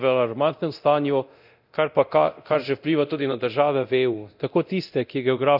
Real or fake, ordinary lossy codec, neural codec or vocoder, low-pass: fake; none; codec, 24 kHz, 0.9 kbps, DualCodec; 5.4 kHz